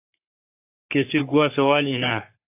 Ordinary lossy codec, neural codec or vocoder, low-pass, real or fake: AAC, 24 kbps; codec, 44.1 kHz, 3.4 kbps, Pupu-Codec; 3.6 kHz; fake